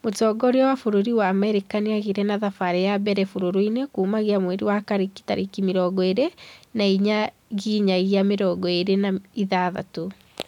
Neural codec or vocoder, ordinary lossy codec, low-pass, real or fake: none; none; 19.8 kHz; real